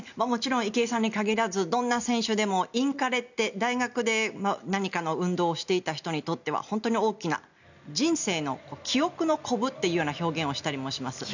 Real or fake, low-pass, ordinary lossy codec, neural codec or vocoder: real; 7.2 kHz; none; none